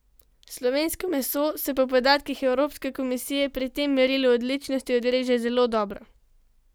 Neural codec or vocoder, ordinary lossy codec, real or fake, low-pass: none; none; real; none